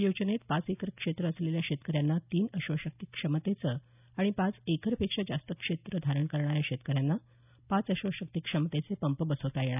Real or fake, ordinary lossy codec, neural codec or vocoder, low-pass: real; none; none; 3.6 kHz